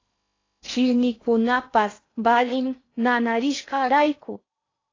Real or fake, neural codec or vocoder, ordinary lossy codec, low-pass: fake; codec, 16 kHz in and 24 kHz out, 0.6 kbps, FocalCodec, streaming, 2048 codes; AAC, 32 kbps; 7.2 kHz